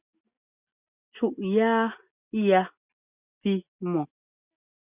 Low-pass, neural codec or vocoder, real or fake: 3.6 kHz; none; real